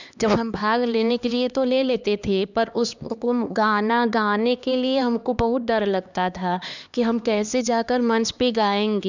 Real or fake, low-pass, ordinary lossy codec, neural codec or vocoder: fake; 7.2 kHz; none; codec, 16 kHz, 2 kbps, X-Codec, HuBERT features, trained on LibriSpeech